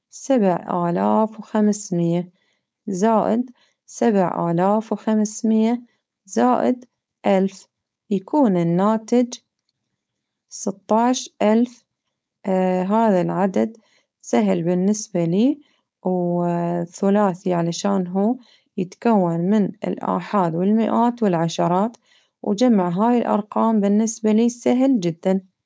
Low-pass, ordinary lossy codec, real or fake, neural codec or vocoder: none; none; fake; codec, 16 kHz, 4.8 kbps, FACodec